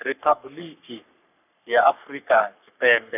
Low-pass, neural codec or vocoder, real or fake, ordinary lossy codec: 3.6 kHz; codec, 44.1 kHz, 3.4 kbps, Pupu-Codec; fake; none